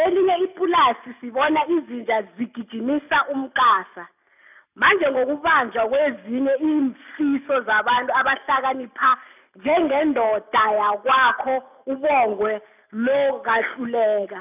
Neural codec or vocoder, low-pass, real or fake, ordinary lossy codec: none; 3.6 kHz; real; none